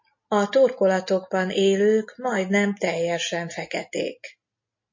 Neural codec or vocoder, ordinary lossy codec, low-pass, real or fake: none; MP3, 32 kbps; 7.2 kHz; real